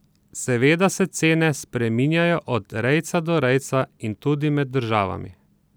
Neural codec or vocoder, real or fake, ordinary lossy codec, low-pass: none; real; none; none